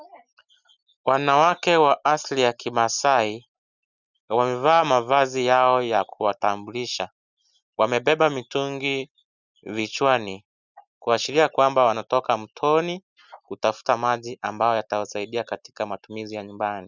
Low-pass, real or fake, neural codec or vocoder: 7.2 kHz; real; none